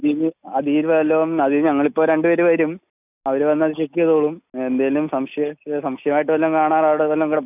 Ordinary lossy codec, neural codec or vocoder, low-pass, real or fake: none; none; 3.6 kHz; real